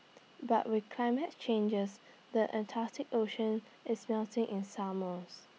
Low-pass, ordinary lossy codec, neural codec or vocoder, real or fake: none; none; none; real